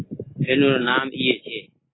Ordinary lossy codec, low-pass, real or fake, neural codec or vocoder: AAC, 16 kbps; 7.2 kHz; real; none